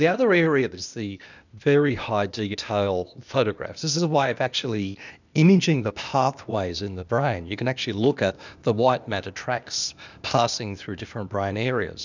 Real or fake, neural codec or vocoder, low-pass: fake; codec, 16 kHz, 0.8 kbps, ZipCodec; 7.2 kHz